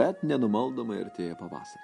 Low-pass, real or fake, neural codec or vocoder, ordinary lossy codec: 14.4 kHz; real; none; MP3, 48 kbps